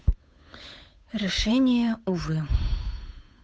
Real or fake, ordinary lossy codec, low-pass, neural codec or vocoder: fake; none; none; codec, 16 kHz, 8 kbps, FunCodec, trained on Chinese and English, 25 frames a second